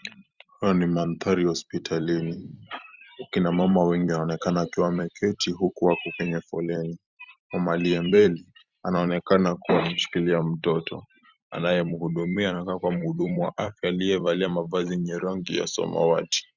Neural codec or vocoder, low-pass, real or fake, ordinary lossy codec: vocoder, 44.1 kHz, 128 mel bands every 256 samples, BigVGAN v2; 7.2 kHz; fake; Opus, 64 kbps